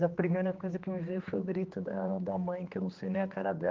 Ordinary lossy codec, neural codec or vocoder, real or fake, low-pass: Opus, 32 kbps; codec, 16 kHz, 2 kbps, X-Codec, HuBERT features, trained on general audio; fake; 7.2 kHz